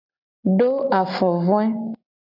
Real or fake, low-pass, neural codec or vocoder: real; 5.4 kHz; none